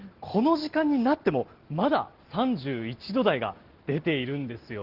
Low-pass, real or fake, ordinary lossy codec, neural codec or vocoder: 5.4 kHz; real; Opus, 16 kbps; none